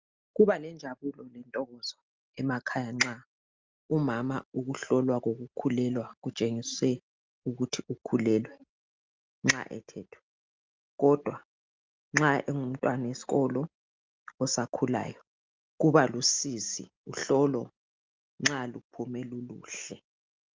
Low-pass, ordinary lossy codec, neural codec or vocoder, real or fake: 7.2 kHz; Opus, 24 kbps; none; real